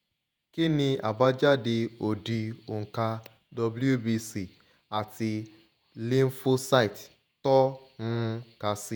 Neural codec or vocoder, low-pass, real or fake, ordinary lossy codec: vocoder, 48 kHz, 128 mel bands, Vocos; none; fake; none